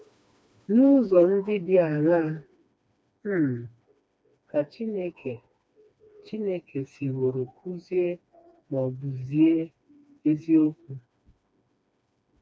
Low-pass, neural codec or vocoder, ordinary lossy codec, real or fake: none; codec, 16 kHz, 2 kbps, FreqCodec, smaller model; none; fake